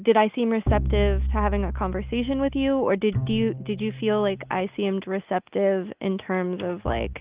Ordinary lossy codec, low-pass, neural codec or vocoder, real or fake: Opus, 32 kbps; 3.6 kHz; none; real